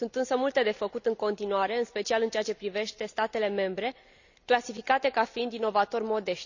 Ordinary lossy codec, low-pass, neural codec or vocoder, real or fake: none; 7.2 kHz; none; real